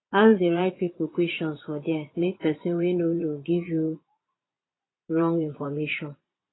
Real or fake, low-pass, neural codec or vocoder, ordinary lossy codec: fake; 7.2 kHz; vocoder, 22.05 kHz, 80 mel bands, Vocos; AAC, 16 kbps